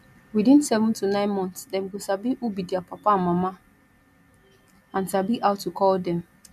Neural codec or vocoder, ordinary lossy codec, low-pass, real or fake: none; none; 14.4 kHz; real